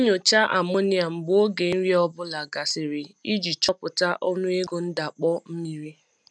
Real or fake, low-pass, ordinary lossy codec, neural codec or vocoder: real; none; none; none